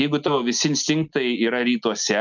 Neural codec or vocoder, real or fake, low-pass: none; real; 7.2 kHz